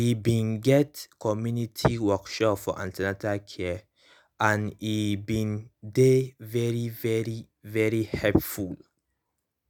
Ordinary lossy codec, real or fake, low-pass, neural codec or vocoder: none; real; none; none